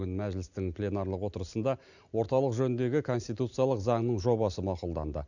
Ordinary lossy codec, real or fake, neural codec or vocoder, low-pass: none; real; none; 7.2 kHz